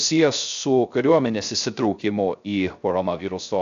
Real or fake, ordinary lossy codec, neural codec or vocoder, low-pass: fake; AAC, 64 kbps; codec, 16 kHz, 0.3 kbps, FocalCodec; 7.2 kHz